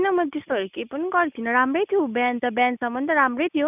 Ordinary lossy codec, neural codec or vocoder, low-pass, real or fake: none; none; 3.6 kHz; real